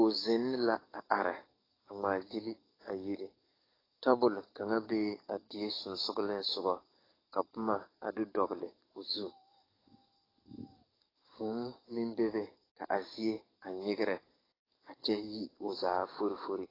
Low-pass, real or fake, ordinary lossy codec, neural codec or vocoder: 5.4 kHz; fake; AAC, 24 kbps; codec, 44.1 kHz, 7.8 kbps, DAC